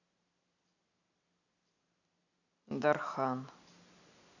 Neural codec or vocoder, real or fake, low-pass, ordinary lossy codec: none; real; 7.2 kHz; none